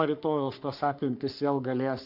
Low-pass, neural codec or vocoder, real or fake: 5.4 kHz; codec, 44.1 kHz, 3.4 kbps, Pupu-Codec; fake